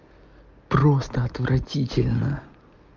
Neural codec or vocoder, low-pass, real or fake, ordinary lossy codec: none; 7.2 kHz; real; Opus, 24 kbps